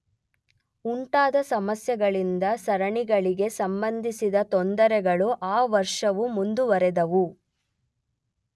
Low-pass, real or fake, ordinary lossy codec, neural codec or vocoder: none; real; none; none